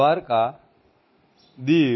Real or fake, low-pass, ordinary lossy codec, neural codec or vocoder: real; 7.2 kHz; MP3, 24 kbps; none